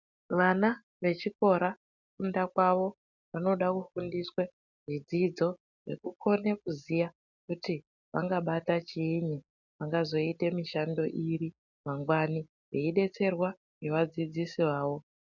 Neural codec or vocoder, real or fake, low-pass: none; real; 7.2 kHz